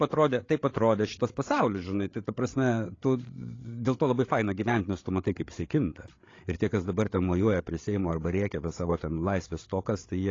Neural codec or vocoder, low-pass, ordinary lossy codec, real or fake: codec, 16 kHz, 8 kbps, FreqCodec, larger model; 7.2 kHz; AAC, 32 kbps; fake